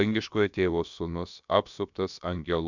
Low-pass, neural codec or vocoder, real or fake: 7.2 kHz; codec, 16 kHz, about 1 kbps, DyCAST, with the encoder's durations; fake